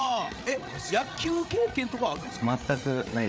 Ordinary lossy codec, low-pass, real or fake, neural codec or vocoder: none; none; fake; codec, 16 kHz, 8 kbps, FreqCodec, larger model